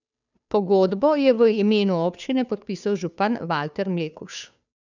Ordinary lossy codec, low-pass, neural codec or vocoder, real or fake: none; 7.2 kHz; codec, 16 kHz, 2 kbps, FunCodec, trained on Chinese and English, 25 frames a second; fake